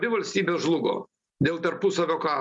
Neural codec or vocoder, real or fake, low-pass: none; real; 10.8 kHz